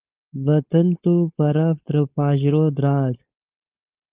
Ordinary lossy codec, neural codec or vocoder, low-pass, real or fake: Opus, 32 kbps; codec, 16 kHz, 4.8 kbps, FACodec; 3.6 kHz; fake